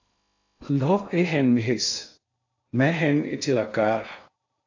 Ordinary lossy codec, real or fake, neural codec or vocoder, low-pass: AAC, 48 kbps; fake; codec, 16 kHz in and 24 kHz out, 0.6 kbps, FocalCodec, streaming, 2048 codes; 7.2 kHz